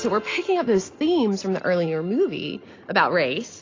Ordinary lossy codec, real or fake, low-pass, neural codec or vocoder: AAC, 32 kbps; real; 7.2 kHz; none